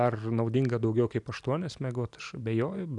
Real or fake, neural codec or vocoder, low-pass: real; none; 10.8 kHz